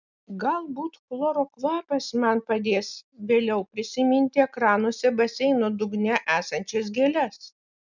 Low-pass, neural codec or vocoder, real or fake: 7.2 kHz; none; real